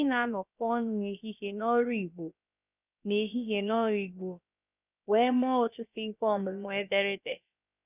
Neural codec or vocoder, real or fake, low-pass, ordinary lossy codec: codec, 16 kHz, about 1 kbps, DyCAST, with the encoder's durations; fake; 3.6 kHz; none